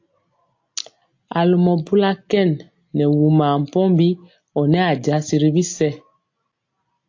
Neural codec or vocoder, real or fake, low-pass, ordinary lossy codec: none; real; 7.2 kHz; AAC, 48 kbps